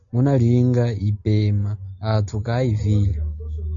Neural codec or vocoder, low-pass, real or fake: none; 7.2 kHz; real